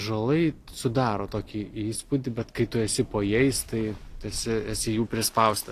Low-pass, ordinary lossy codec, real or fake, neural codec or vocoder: 14.4 kHz; AAC, 48 kbps; real; none